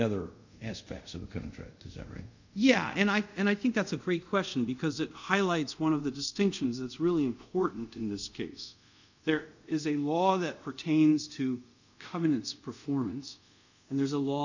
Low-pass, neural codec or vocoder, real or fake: 7.2 kHz; codec, 24 kHz, 0.5 kbps, DualCodec; fake